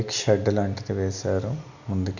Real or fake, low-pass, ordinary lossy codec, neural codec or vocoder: real; 7.2 kHz; none; none